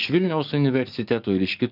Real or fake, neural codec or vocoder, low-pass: fake; codec, 44.1 kHz, 7.8 kbps, DAC; 5.4 kHz